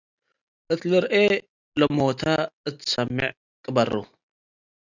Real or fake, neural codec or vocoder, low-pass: real; none; 7.2 kHz